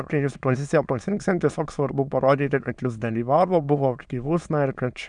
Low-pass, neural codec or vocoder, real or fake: 9.9 kHz; autoencoder, 22.05 kHz, a latent of 192 numbers a frame, VITS, trained on many speakers; fake